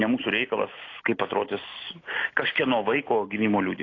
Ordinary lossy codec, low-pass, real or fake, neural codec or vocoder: AAC, 32 kbps; 7.2 kHz; real; none